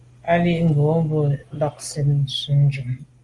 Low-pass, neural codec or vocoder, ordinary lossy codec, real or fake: 10.8 kHz; none; Opus, 24 kbps; real